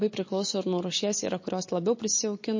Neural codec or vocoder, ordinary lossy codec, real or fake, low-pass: none; MP3, 32 kbps; real; 7.2 kHz